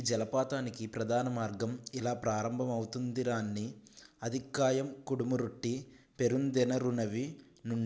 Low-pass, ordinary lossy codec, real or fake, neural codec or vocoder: none; none; real; none